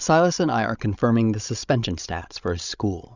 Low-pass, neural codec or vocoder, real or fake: 7.2 kHz; codec, 16 kHz, 16 kbps, FreqCodec, larger model; fake